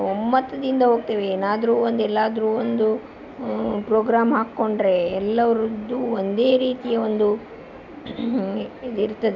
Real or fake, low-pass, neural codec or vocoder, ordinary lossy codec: real; 7.2 kHz; none; none